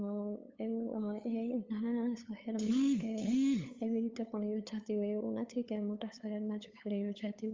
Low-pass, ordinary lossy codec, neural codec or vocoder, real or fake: 7.2 kHz; Opus, 32 kbps; codec, 16 kHz, 8 kbps, FunCodec, trained on LibriTTS, 25 frames a second; fake